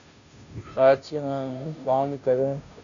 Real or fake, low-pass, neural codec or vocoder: fake; 7.2 kHz; codec, 16 kHz, 0.5 kbps, FunCodec, trained on Chinese and English, 25 frames a second